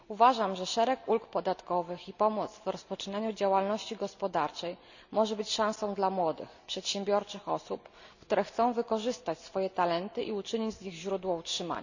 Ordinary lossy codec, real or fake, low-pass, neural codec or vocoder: none; real; 7.2 kHz; none